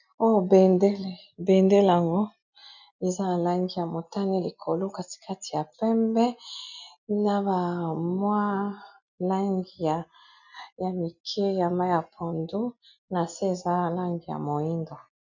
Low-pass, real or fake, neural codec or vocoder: 7.2 kHz; real; none